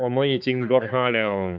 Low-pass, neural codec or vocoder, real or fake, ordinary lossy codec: none; codec, 16 kHz, 4 kbps, X-Codec, HuBERT features, trained on balanced general audio; fake; none